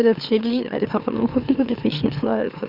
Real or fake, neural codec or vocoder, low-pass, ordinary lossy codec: fake; autoencoder, 44.1 kHz, a latent of 192 numbers a frame, MeloTTS; 5.4 kHz; Opus, 64 kbps